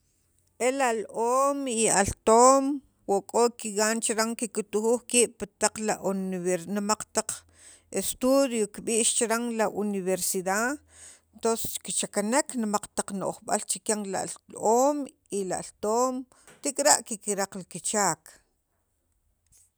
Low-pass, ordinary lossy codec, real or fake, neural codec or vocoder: none; none; real; none